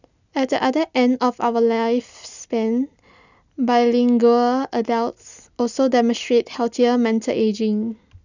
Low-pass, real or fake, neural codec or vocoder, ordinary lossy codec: 7.2 kHz; real; none; none